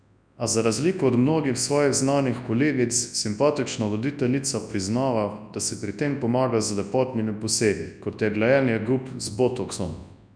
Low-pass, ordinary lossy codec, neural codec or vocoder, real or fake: 9.9 kHz; none; codec, 24 kHz, 0.9 kbps, WavTokenizer, large speech release; fake